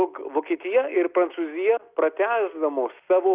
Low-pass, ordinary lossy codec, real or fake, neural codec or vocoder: 3.6 kHz; Opus, 32 kbps; real; none